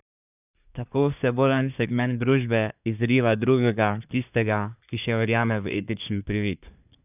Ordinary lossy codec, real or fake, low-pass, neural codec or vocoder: none; fake; 3.6 kHz; codec, 24 kHz, 1 kbps, SNAC